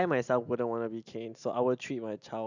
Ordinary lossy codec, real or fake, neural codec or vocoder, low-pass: none; real; none; 7.2 kHz